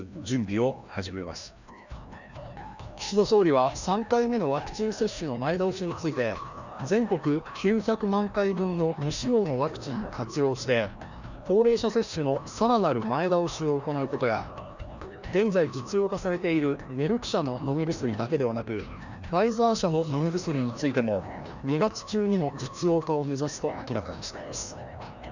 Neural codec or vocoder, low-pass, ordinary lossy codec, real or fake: codec, 16 kHz, 1 kbps, FreqCodec, larger model; 7.2 kHz; none; fake